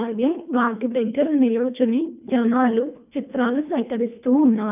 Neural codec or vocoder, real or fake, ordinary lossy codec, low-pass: codec, 24 kHz, 1.5 kbps, HILCodec; fake; none; 3.6 kHz